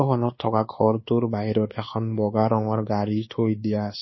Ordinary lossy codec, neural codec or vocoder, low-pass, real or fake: MP3, 24 kbps; codec, 24 kHz, 1.2 kbps, DualCodec; 7.2 kHz; fake